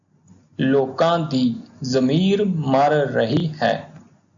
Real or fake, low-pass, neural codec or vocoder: real; 7.2 kHz; none